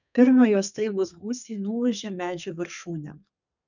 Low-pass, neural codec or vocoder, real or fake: 7.2 kHz; codec, 32 kHz, 1.9 kbps, SNAC; fake